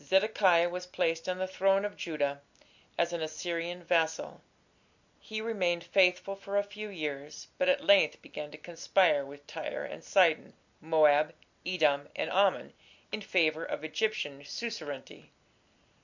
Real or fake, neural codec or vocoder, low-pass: real; none; 7.2 kHz